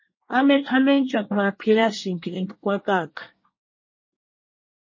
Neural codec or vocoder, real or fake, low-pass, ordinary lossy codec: codec, 24 kHz, 1 kbps, SNAC; fake; 7.2 kHz; MP3, 32 kbps